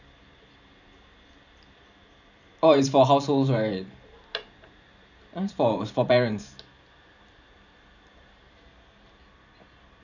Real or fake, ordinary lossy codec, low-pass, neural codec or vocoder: fake; none; 7.2 kHz; vocoder, 44.1 kHz, 128 mel bands every 512 samples, BigVGAN v2